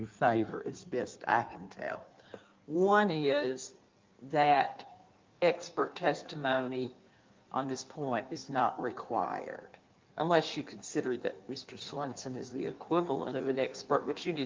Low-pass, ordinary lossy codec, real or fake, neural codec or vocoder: 7.2 kHz; Opus, 24 kbps; fake; codec, 16 kHz in and 24 kHz out, 1.1 kbps, FireRedTTS-2 codec